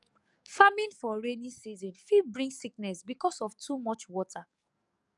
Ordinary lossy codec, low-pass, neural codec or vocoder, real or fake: none; 10.8 kHz; codec, 44.1 kHz, 7.8 kbps, DAC; fake